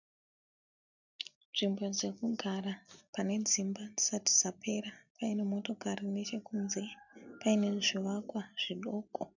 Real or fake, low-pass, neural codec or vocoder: real; 7.2 kHz; none